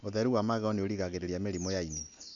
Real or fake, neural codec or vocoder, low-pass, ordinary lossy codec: real; none; 7.2 kHz; none